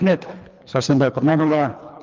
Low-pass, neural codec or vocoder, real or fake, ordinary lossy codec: 7.2 kHz; codec, 24 kHz, 3 kbps, HILCodec; fake; Opus, 24 kbps